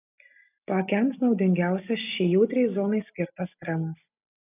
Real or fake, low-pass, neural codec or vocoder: real; 3.6 kHz; none